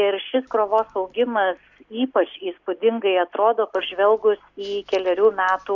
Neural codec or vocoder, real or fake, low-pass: none; real; 7.2 kHz